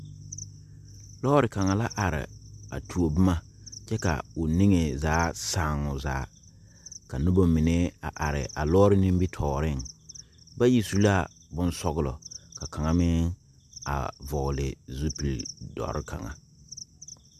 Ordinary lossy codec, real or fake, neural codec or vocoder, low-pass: MP3, 96 kbps; real; none; 14.4 kHz